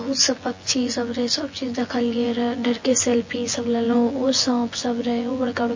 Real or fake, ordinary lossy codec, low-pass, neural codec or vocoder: fake; MP3, 32 kbps; 7.2 kHz; vocoder, 24 kHz, 100 mel bands, Vocos